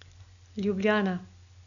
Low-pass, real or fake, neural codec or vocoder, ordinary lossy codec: 7.2 kHz; real; none; none